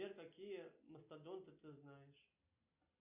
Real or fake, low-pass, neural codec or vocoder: real; 3.6 kHz; none